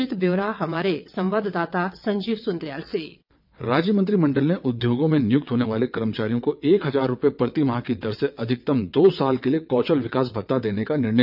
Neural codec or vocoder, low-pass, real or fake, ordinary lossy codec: vocoder, 22.05 kHz, 80 mel bands, WaveNeXt; 5.4 kHz; fake; none